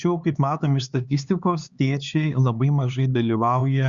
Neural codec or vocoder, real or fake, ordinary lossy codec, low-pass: codec, 16 kHz, 4 kbps, X-Codec, HuBERT features, trained on LibriSpeech; fake; Opus, 64 kbps; 7.2 kHz